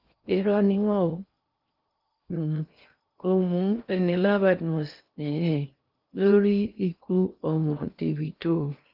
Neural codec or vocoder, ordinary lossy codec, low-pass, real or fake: codec, 16 kHz in and 24 kHz out, 0.6 kbps, FocalCodec, streaming, 2048 codes; Opus, 32 kbps; 5.4 kHz; fake